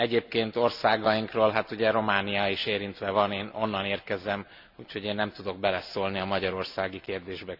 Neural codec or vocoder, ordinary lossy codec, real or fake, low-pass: none; none; real; 5.4 kHz